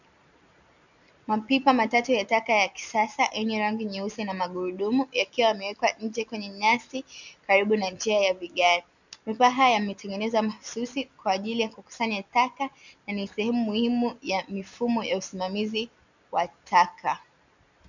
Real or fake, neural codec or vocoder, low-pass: real; none; 7.2 kHz